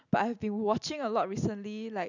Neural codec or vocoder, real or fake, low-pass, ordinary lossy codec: none; real; 7.2 kHz; none